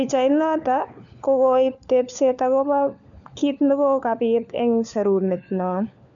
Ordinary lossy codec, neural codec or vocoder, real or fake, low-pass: none; codec, 16 kHz, 4 kbps, FunCodec, trained on LibriTTS, 50 frames a second; fake; 7.2 kHz